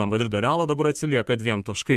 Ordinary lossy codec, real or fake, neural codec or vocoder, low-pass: MP3, 96 kbps; fake; codec, 32 kHz, 1.9 kbps, SNAC; 14.4 kHz